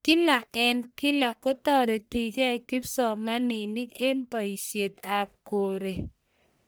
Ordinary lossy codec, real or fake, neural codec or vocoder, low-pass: none; fake; codec, 44.1 kHz, 1.7 kbps, Pupu-Codec; none